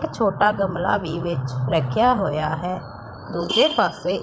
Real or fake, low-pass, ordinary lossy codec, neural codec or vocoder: fake; none; none; codec, 16 kHz, 8 kbps, FreqCodec, larger model